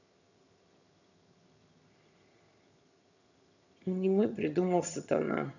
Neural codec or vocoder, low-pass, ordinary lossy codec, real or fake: vocoder, 22.05 kHz, 80 mel bands, HiFi-GAN; 7.2 kHz; none; fake